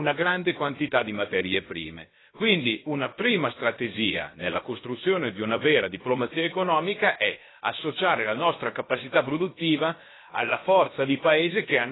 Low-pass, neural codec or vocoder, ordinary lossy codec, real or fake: 7.2 kHz; codec, 16 kHz, about 1 kbps, DyCAST, with the encoder's durations; AAC, 16 kbps; fake